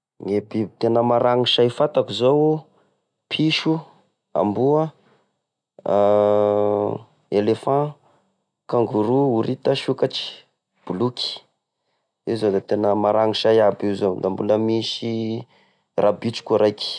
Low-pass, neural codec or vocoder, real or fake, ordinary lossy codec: 9.9 kHz; none; real; none